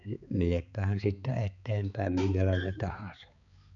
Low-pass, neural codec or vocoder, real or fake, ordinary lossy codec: 7.2 kHz; codec, 16 kHz, 4 kbps, X-Codec, HuBERT features, trained on balanced general audio; fake; none